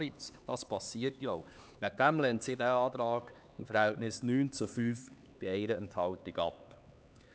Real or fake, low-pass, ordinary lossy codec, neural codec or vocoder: fake; none; none; codec, 16 kHz, 4 kbps, X-Codec, HuBERT features, trained on LibriSpeech